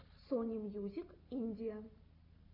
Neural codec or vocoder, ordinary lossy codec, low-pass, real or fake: none; AAC, 32 kbps; 5.4 kHz; real